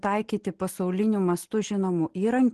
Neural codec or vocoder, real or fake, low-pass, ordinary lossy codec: none; real; 10.8 kHz; Opus, 16 kbps